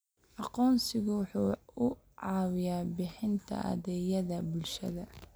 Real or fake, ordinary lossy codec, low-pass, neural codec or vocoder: real; none; none; none